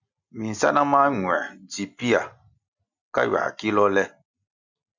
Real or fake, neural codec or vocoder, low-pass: real; none; 7.2 kHz